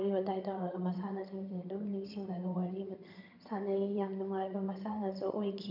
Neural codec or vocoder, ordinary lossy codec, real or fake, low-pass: vocoder, 22.05 kHz, 80 mel bands, HiFi-GAN; none; fake; 5.4 kHz